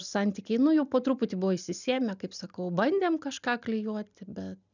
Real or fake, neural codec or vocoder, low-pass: real; none; 7.2 kHz